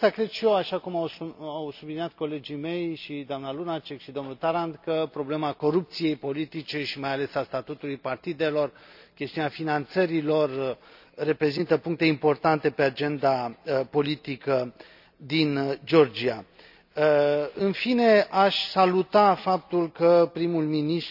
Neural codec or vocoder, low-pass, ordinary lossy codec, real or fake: none; 5.4 kHz; none; real